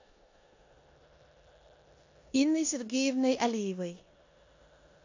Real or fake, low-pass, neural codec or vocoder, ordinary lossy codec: fake; 7.2 kHz; codec, 16 kHz in and 24 kHz out, 0.9 kbps, LongCat-Audio-Codec, four codebook decoder; AAC, 48 kbps